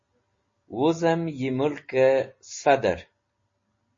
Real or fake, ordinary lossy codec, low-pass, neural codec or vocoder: real; MP3, 32 kbps; 7.2 kHz; none